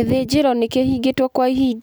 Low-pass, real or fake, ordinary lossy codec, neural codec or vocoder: none; real; none; none